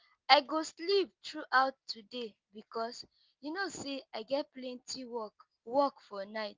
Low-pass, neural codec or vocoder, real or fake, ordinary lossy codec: 7.2 kHz; none; real; Opus, 32 kbps